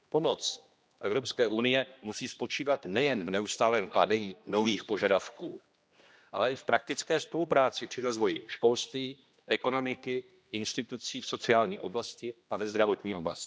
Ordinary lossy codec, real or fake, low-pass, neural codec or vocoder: none; fake; none; codec, 16 kHz, 1 kbps, X-Codec, HuBERT features, trained on general audio